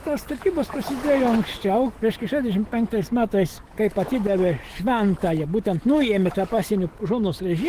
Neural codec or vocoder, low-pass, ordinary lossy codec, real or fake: autoencoder, 48 kHz, 128 numbers a frame, DAC-VAE, trained on Japanese speech; 14.4 kHz; Opus, 16 kbps; fake